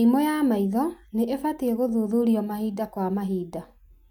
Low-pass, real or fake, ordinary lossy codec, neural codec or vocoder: 19.8 kHz; real; none; none